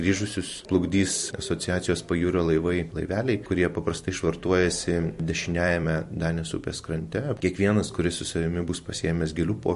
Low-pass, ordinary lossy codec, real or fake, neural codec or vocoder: 14.4 kHz; MP3, 48 kbps; fake; vocoder, 44.1 kHz, 128 mel bands every 256 samples, BigVGAN v2